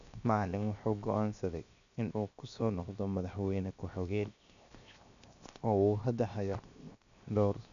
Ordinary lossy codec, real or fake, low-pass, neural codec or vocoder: MP3, 96 kbps; fake; 7.2 kHz; codec, 16 kHz, 0.7 kbps, FocalCodec